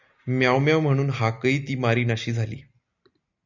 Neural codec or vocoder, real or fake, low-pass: none; real; 7.2 kHz